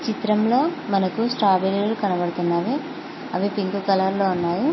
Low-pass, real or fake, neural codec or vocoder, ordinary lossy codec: 7.2 kHz; real; none; MP3, 24 kbps